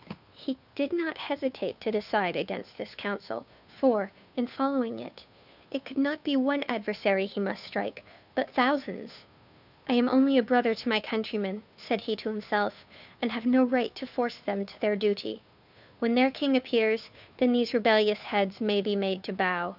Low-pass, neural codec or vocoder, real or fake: 5.4 kHz; codec, 16 kHz, 2 kbps, FunCodec, trained on Chinese and English, 25 frames a second; fake